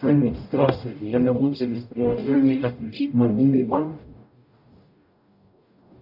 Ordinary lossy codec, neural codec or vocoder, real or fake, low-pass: AAC, 48 kbps; codec, 44.1 kHz, 0.9 kbps, DAC; fake; 5.4 kHz